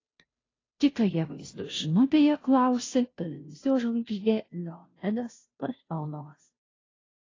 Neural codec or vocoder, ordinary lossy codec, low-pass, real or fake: codec, 16 kHz, 0.5 kbps, FunCodec, trained on Chinese and English, 25 frames a second; AAC, 32 kbps; 7.2 kHz; fake